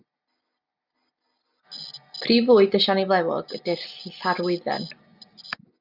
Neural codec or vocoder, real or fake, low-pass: none; real; 5.4 kHz